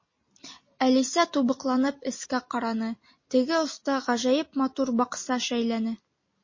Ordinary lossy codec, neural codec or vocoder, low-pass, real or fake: MP3, 32 kbps; none; 7.2 kHz; real